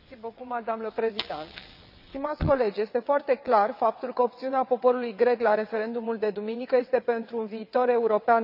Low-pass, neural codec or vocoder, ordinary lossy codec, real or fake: 5.4 kHz; vocoder, 22.05 kHz, 80 mel bands, WaveNeXt; none; fake